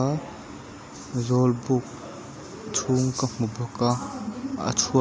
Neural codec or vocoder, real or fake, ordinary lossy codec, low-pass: none; real; none; none